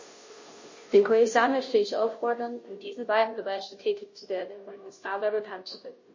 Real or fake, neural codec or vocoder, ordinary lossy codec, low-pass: fake; codec, 16 kHz, 0.5 kbps, FunCodec, trained on Chinese and English, 25 frames a second; MP3, 32 kbps; 7.2 kHz